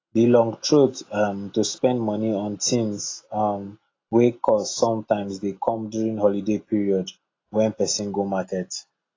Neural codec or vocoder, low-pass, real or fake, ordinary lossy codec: none; 7.2 kHz; real; AAC, 32 kbps